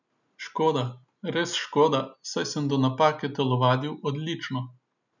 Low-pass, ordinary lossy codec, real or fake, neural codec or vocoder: 7.2 kHz; none; real; none